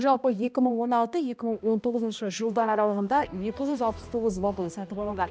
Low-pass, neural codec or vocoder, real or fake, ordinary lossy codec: none; codec, 16 kHz, 1 kbps, X-Codec, HuBERT features, trained on balanced general audio; fake; none